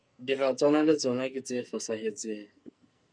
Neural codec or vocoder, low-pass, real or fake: codec, 44.1 kHz, 2.6 kbps, SNAC; 9.9 kHz; fake